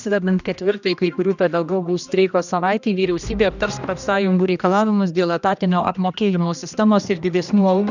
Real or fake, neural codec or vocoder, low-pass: fake; codec, 16 kHz, 1 kbps, X-Codec, HuBERT features, trained on general audio; 7.2 kHz